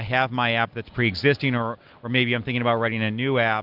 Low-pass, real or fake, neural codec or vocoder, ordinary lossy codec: 5.4 kHz; real; none; Opus, 32 kbps